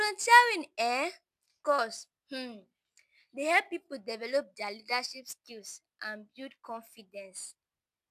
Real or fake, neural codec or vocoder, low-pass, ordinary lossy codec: real; none; 14.4 kHz; none